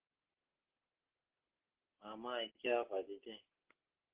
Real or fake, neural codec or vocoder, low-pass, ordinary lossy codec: real; none; 3.6 kHz; Opus, 16 kbps